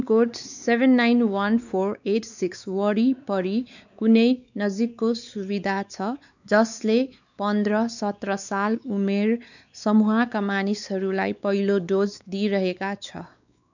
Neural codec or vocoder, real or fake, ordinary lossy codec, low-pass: codec, 16 kHz, 4 kbps, X-Codec, WavLM features, trained on Multilingual LibriSpeech; fake; none; 7.2 kHz